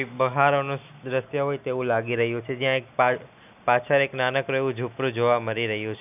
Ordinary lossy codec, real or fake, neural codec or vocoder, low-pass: none; real; none; 3.6 kHz